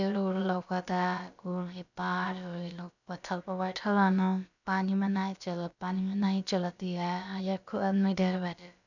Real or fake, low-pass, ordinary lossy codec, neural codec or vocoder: fake; 7.2 kHz; none; codec, 16 kHz, about 1 kbps, DyCAST, with the encoder's durations